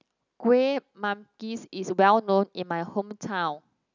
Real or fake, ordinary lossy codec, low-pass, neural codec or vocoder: real; none; 7.2 kHz; none